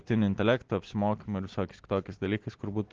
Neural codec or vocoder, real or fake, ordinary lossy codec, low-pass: none; real; Opus, 16 kbps; 7.2 kHz